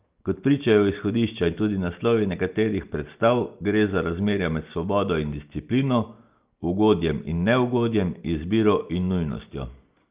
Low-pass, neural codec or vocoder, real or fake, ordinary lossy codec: 3.6 kHz; none; real; Opus, 32 kbps